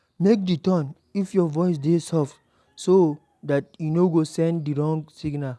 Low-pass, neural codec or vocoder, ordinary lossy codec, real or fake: none; none; none; real